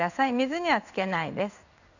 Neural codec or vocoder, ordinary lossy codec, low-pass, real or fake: codec, 16 kHz in and 24 kHz out, 1 kbps, XY-Tokenizer; none; 7.2 kHz; fake